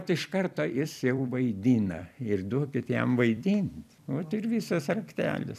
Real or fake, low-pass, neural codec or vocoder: fake; 14.4 kHz; vocoder, 44.1 kHz, 128 mel bands every 256 samples, BigVGAN v2